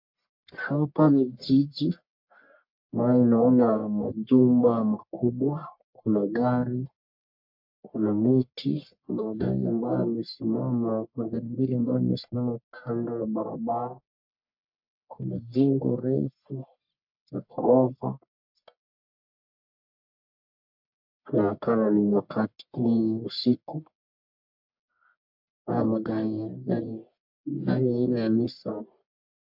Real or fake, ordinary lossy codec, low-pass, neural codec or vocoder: fake; MP3, 48 kbps; 5.4 kHz; codec, 44.1 kHz, 1.7 kbps, Pupu-Codec